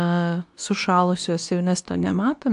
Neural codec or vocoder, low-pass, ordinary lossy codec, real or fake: codec, 24 kHz, 0.9 kbps, WavTokenizer, medium speech release version 2; 10.8 kHz; AAC, 64 kbps; fake